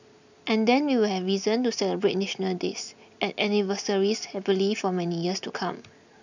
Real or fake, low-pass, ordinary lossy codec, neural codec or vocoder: real; 7.2 kHz; none; none